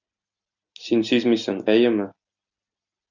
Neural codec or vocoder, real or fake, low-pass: none; real; 7.2 kHz